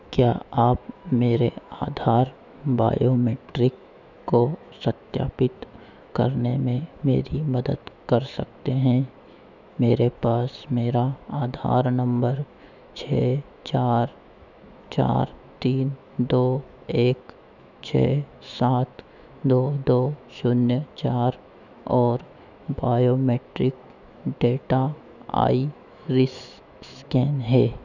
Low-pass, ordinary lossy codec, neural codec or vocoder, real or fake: 7.2 kHz; none; autoencoder, 48 kHz, 128 numbers a frame, DAC-VAE, trained on Japanese speech; fake